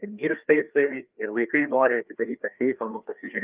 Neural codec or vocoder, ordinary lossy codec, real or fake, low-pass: codec, 16 kHz, 2 kbps, FreqCodec, larger model; Opus, 24 kbps; fake; 3.6 kHz